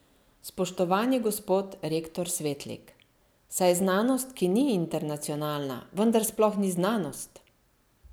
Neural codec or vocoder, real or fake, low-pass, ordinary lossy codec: none; real; none; none